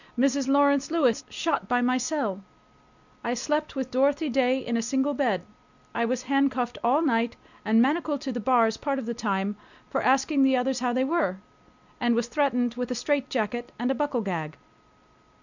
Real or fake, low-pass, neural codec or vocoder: real; 7.2 kHz; none